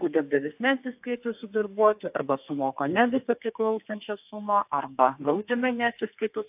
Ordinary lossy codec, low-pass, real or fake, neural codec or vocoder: AAC, 32 kbps; 3.6 kHz; fake; codec, 32 kHz, 1.9 kbps, SNAC